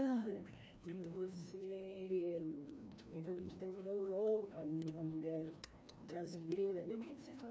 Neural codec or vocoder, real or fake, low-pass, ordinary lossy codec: codec, 16 kHz, 1 kbps, FreqCodec, larger model; fake; none; none